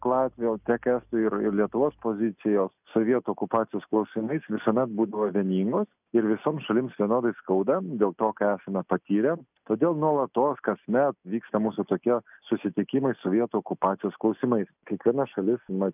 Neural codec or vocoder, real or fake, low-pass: none; real; 3.6 kHz